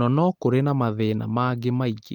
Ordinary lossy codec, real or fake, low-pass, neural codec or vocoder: Opus, 24 kbps; real; 19.8 kHz; none